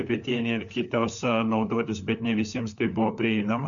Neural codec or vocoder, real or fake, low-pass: codec, 16 kHz, 4 kbps, FunCodec, trained on LibriTTS, 50 frames a second; fake; 7.2 kHz